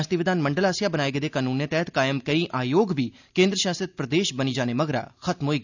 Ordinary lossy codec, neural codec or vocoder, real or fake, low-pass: none; none; real; 7.2 kHz